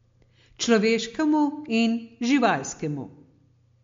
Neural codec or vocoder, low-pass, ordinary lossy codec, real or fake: none; 7.2 kHz; MP3, 48 kbps; real